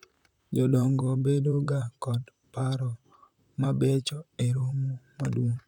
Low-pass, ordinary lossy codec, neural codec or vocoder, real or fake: 19.8 kHz; none; vocoder, 44.1 kHz, 128 mel bands, Pupu-Vocoder; fake